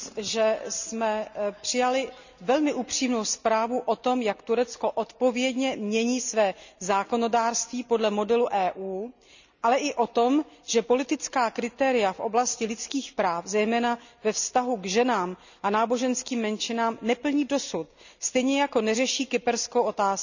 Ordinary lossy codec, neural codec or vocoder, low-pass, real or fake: none; none; 7.2 kHz; real